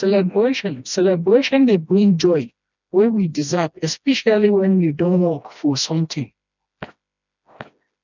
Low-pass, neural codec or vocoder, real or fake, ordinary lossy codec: 7.2 kHz; codec, 16 kHz, 1 kbps, FreqCodec, smaller model; fake; none